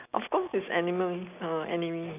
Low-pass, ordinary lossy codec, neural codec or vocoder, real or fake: 3.6 kHz; none; none; real